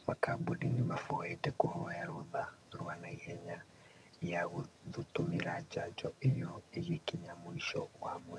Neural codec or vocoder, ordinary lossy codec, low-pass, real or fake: vocoder, 22.05 kHz, 80 mel bands, HiFi-GAN; none; none; fake